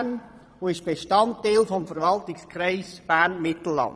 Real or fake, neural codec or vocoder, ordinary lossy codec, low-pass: fake; vocoder, 22.05 kHz, 80 mel bands, Vocos; none; none